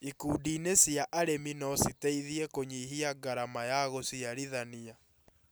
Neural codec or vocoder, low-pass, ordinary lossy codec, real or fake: none; none; none; real